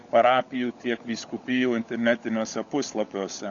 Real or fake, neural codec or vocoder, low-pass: fake; codec, 16 kHz, 16 kbps, FunCodec, trained on LibriTTS, 50 frames a second; 7.2 kHz